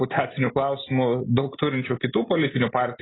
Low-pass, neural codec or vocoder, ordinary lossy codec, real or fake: 7.2 kHz; none; AAC, 16 kbps; real